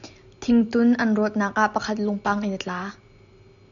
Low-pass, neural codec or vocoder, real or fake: 7.2 kHz; none; real